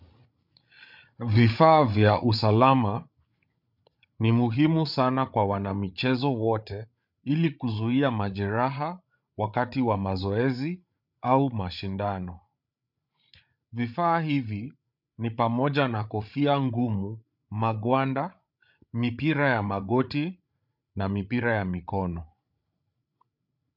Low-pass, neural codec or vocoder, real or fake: 5.4 kHz; codec, 16 kHz, 8 kbps, FreqCodec, larger model; fake